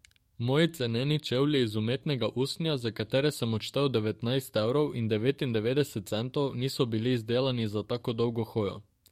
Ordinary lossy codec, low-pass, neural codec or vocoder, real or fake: MP3, 64 kbps; 19.8 kHz; codec, 44.1 kHz, 7.8 kbps, DAC; fake